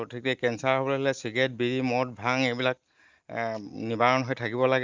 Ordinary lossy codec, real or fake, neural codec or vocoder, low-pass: Opus, 24 kbps; real; none; 7.2 kHz